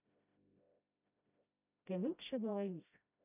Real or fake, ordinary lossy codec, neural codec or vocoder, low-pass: fake; none; codec, 16 kHz, 0.5 kbps, FreqCodec, smaller model; 3.6 kHz